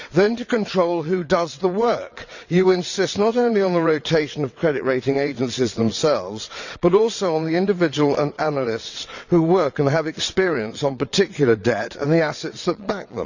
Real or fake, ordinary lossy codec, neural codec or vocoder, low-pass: fake; none; vocoder, 22.05 kHz, 80 mel bands, WaveNeXt; 7.2 kHz